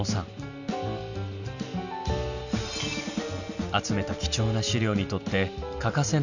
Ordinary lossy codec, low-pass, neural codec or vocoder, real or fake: none; 7.2 kHz; none; real